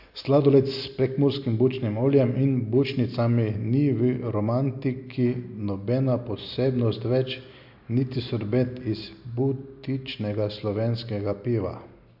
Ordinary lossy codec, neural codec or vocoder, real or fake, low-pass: MP3, 48 kbps; none; real; 5.4 kHz